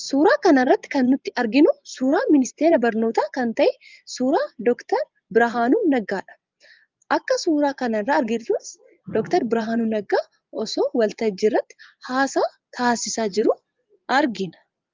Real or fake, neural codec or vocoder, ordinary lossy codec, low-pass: fake; vocoder, 24 kHz, 100 mel bands, Vocos; Opus, 24 kbps; 7.2 kHz